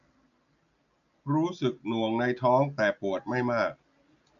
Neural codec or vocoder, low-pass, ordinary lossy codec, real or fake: none; 7.2 kHz; none; real